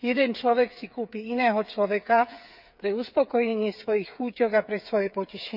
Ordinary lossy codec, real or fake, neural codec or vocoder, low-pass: none; fake; codec, 16 kHz, 8 kbps, FreqCodec, smaller model; 5.4 kHz